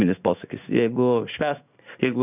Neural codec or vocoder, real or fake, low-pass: codec, 16 kHz in and 24 kHz out, 1 kbps, XY-Tokenizer; fake; 3.6 kHz